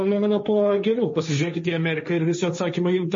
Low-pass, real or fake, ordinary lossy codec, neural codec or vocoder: 7.2 kHz; fake; MP3, 32 kbps; codec, 16 kHz, 1.1 kbps, Voila-Tokenizer